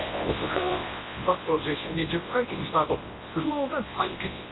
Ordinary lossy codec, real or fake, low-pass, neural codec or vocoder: AAC, 16 kbps; fake; 7.2 kHz; codec, 24 kHz, 0.9 kbps, WavTokenizer, large speech release